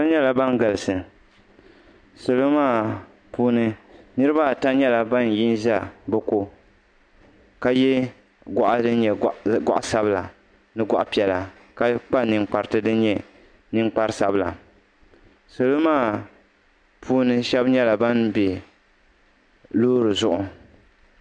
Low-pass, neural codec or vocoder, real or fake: 9.9 kHz; none; real